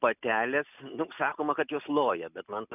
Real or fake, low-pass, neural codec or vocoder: real; 3.6 kHz; none